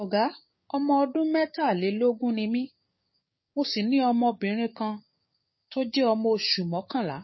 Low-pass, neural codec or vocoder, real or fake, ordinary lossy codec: 7.2 kHz; codec, 16 kHz, 6 kbps, DAC; fake; MP3, 24 kbps